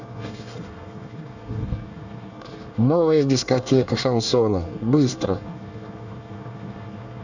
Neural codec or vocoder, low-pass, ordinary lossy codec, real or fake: codec, 24 kHz, 1 kbps, SNAC; 7.2 kHz; none; fake